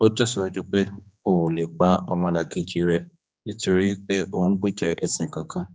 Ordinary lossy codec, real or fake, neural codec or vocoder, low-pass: none; fake; codec, 16 kHz, 2 kbps, X-Codec, HuBERT features, trained on general audio; none